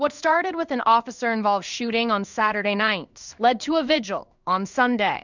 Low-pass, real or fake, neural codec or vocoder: 7.2 kHz; fake; codec, 16 kHz in and 24 kHz out, 1 kbps, XY-Tokenizer